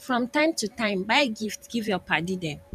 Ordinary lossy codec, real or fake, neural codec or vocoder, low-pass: none; fake; vocoder, 44.1 kHz, 128 mel bands every 512 samples, BigVGAN v2; 14.4 kHz